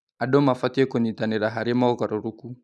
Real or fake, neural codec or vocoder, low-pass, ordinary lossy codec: real; none; none; none